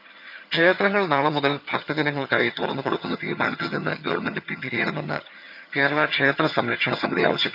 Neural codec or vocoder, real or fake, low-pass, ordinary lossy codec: vocoder, 22.05 kHz, 80 mel bands, HiFi-GAN; fake; 5.4 kHz; none